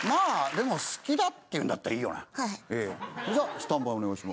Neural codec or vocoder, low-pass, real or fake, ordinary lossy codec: none; none; real; none